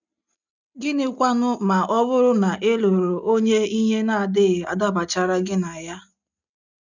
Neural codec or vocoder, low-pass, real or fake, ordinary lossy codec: none; 7.2 kHz; real; none